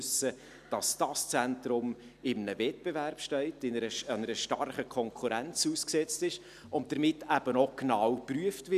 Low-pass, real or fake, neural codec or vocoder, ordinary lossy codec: 14.4 kHz; real; none; none